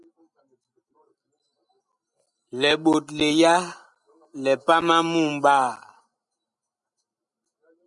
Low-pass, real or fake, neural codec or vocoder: 10.8 kHz; real; none